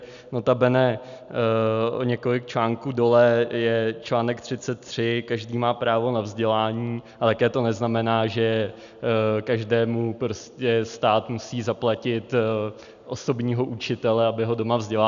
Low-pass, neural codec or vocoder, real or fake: 7.2 kHz; none; real